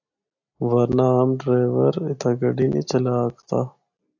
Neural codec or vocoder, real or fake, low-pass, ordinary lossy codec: none; real; 7.2 kHz; AAC, 48 kbps